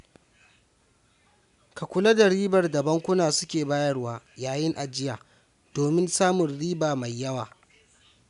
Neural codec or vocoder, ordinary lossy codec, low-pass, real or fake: none; none; 10.8 kHz; real